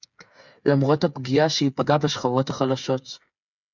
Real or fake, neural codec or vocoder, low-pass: fake; codec, 16 kHz, 8 kbps, FreqCodec, smaller model; 7.2 kHz